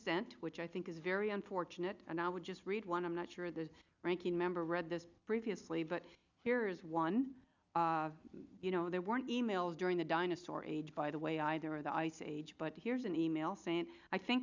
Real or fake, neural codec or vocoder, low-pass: real; none; 7.2 kHz